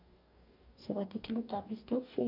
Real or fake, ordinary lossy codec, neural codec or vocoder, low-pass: fake; none; codec, 44.1 kHz, 2.6 kbps, DAC; 5.4 kHz